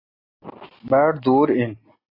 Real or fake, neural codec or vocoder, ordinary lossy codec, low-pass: real; none; AAC, 24 kbps; 5.4 kHz